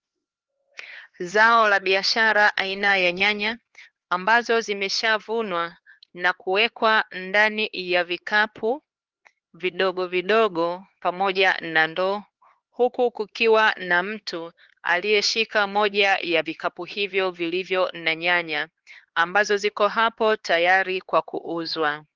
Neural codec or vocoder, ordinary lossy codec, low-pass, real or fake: codec, 16 kHz, 4 kbps, X-Codec, HuBERT features, trained on LibriSpeech; Opus, 16 kbps; 7.2 kHz; fake